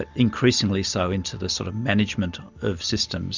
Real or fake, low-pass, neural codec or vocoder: real; 7.2 kHz; none